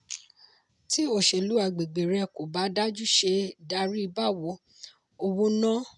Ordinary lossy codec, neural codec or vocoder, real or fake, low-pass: none; none; real; 10.8 kHz